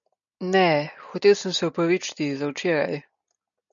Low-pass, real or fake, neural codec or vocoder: 7.2 kHz; real; none